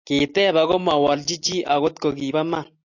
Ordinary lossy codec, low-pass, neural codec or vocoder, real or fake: AAC, 48 kbps; 7.2 kHz; none; real